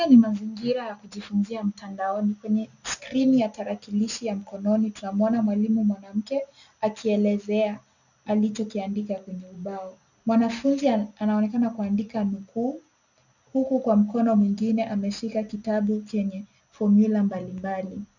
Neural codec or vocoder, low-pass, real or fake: none; 7.2 kHz; real